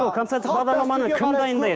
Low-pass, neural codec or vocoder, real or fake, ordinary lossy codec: none; codec, 16 kHz, 6 kbps, DAC; fake; none